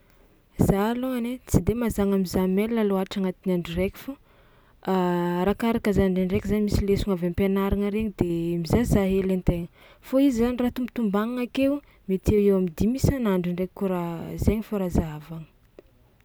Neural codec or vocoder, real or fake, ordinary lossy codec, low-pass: none; real; none; none